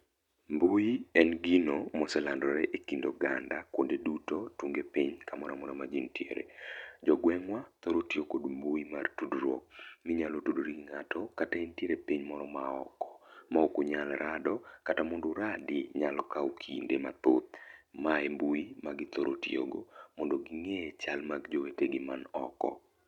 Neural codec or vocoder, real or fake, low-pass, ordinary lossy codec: none; real; 19.8 kHz; none